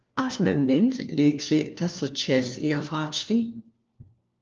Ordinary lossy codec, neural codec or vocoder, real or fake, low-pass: Opus, 24 kbps; codec, 16 kHz, 1 kbps, FunCodec, trained on LibriTTS, 50 frames a second; fake; 7.2 kHz